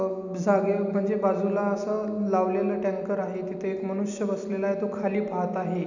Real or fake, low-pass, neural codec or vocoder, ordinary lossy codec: real; 7.2 kHz; none; none